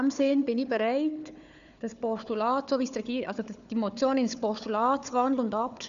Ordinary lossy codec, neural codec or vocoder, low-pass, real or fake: none; codec, 16 kHz, 4 kbps, FunCodec, trained on Chinese and English, 50 frames a second; 7.2 kHz; fake